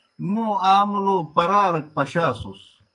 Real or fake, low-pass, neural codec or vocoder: fake; 10.8 kHz; codec, 44.1 kHz, 2.6 kbps, SNAC